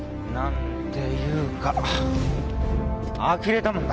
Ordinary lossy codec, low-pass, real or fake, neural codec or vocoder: none; none; real; none